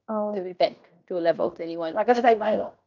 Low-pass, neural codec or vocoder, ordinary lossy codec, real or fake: 7.2 kHz; codec, 16 kHz in and 24 kHz out, 0.9 kbps, LongCat-Audio-Codec, fine tuned four codebook decoder; none; fake